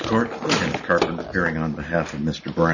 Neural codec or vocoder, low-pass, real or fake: none; 7.2 kHz; real